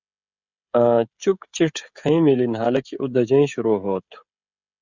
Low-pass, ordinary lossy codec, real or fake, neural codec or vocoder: 7.2 kHz; Opus, 64 kbps; fake; codec, 16 kHz, 16 kbps, FreqCodec, smaller model